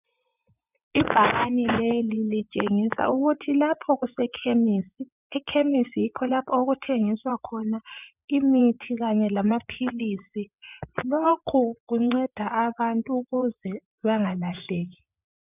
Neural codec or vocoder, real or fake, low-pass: vocoder, 24 kHz, 100 mel bands, Vocos; fake; 3.6 kHz